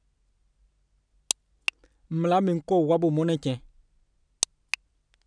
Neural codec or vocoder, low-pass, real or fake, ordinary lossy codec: none; 9.9 kHz; real; none